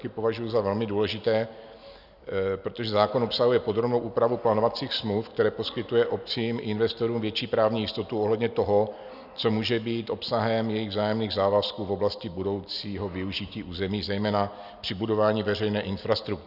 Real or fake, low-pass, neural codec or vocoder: real; 5.4 kHz; none